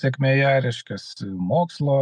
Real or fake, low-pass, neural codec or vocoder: real; 9.9 kHz; none